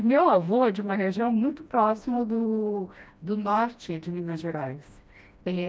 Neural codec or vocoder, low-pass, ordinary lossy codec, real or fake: codec, 16 kHz, 1 kbps, FreqCodec, smaller model; none; none; fake